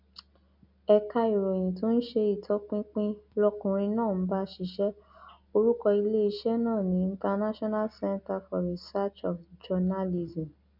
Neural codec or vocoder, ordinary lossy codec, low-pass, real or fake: none; none; 5.4 kHz; real